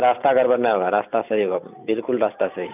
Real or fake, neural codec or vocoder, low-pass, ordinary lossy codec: fake; vocoder, 44.1 kHz, 128 mel bands every 256 samples, BigVGAN v2; 3.6 kHz; none